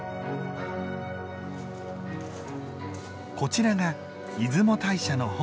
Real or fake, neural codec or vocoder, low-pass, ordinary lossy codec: real; none; none; none